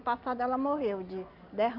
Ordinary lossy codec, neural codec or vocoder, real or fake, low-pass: Opus, 64 kbps; none; real; 5.4 kHz